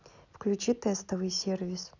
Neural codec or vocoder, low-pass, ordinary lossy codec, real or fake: none; 7.2 kHz; none; real